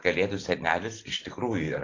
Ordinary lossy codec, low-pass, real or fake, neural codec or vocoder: AAC, 32 kbps; 7.2 kHz; real; none